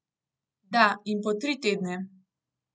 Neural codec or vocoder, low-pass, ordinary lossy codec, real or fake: none; none; none; real